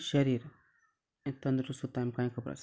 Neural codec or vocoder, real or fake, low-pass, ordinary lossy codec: none; real; none; none